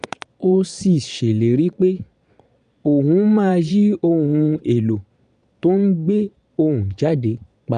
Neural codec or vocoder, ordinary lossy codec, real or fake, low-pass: vocoder, 22.05 kHz, 80 mel bands, Vocos; none; fake; 9.9 kHz